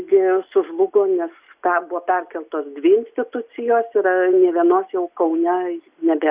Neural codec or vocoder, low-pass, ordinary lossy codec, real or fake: none; 3.6 kHz; Opus, 64 kbps; real